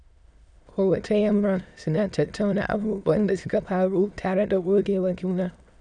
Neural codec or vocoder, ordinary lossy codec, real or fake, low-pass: autoencoder, 22.05 kHz, a latent of 192 numbers a frame, VITS, trained on many speakers; none; fake; 9.9 kHz